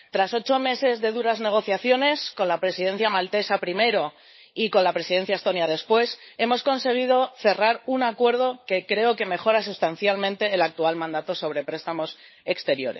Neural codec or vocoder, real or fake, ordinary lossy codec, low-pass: codec, 16 kHz, 16 kbps, FunCodec, trained on LibriTTS, 50 frames a second; fake; MP3, 24 kbps; 7.2 kHz